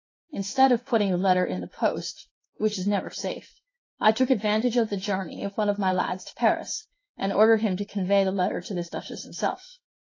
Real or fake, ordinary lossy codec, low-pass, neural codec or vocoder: fake; AAC, 32 kbps; 7.2 kHz; codec, 16 kHz in and 24 kHz out, 1 kbps, XY-Tokenizer